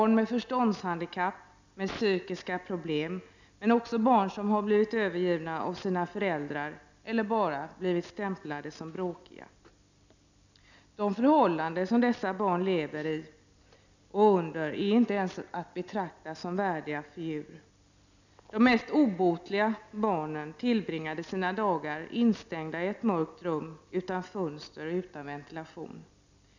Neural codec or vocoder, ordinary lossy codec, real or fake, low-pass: none; none; real; 7.2 kHz